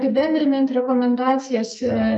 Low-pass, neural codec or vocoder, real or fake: 10.8 kHz; codec, 44.1 kHz, 2.6 kbps, SNAC; fake